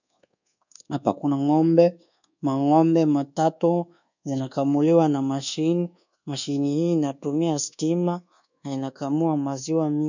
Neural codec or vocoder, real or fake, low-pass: codec, 24 kHz, 1.2 kbps, DualCodec; fake; 7.2 kHz